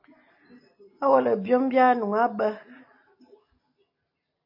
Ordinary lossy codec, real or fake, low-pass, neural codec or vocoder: MP3, 32 kbps; real; 5.4 kHz; none